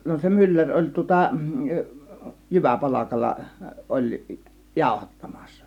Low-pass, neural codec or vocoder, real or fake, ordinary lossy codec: 19.8 kHz; none; real; none